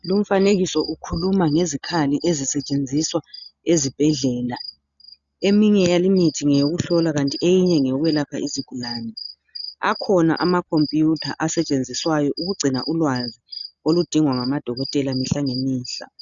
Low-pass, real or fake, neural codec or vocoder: 7.2 kHz; real; none